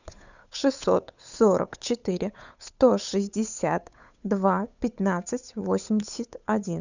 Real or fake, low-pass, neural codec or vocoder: fake; 7.2 kHz; codec, 16 kHz, 8 kbps, FunCodec, trained on Chinese and English, 25 frames a second